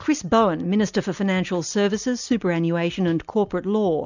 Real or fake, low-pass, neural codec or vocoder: real; 7.2 kHz; none